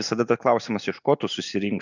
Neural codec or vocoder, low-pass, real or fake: none; 7.2 kHz; real